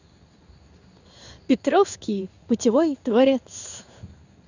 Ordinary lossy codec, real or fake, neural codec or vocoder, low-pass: none; fake; codec, 16 kHz in and 24 kHz out, 1 kbps, XY-Tokenizer; 7.2 kHz